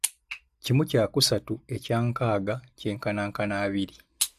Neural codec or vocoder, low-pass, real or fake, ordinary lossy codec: none; 14.4 kHz; real; AAC, 96 kbps